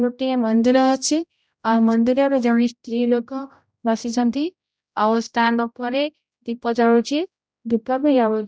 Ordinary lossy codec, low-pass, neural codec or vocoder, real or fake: none; none; codec, 16 kHz, 0.5 kbps, X-Codec, HuBERT features, trained on general audio; fake